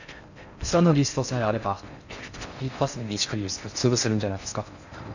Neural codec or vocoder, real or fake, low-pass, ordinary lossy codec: codec, 16 kHz in and 24 kHz out, 0.6 kbps, FocalCodec, streaming, 4096 codes; fake; 7.2 kHz; none